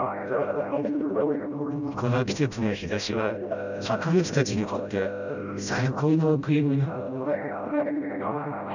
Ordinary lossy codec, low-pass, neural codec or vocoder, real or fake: none; 7.2 kHz; codec, 16 kHz, 0.5 kbps, FreqCodec, smaller model; fake